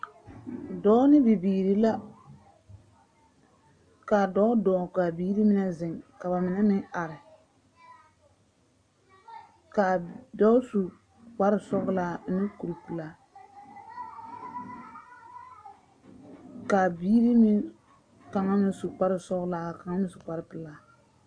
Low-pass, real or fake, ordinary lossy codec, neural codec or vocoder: 9.9 kHz; real; AAC, 64 kbps; none